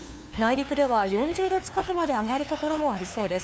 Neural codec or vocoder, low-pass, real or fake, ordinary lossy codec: codec, 16 kHz, 2 kbps, FunCodec, trained on LibriTTS, 25 frames a second; none; fake; none